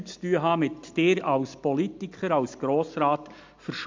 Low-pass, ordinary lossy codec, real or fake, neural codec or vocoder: 7.2 kHz; MP3, 64 kbps; real; none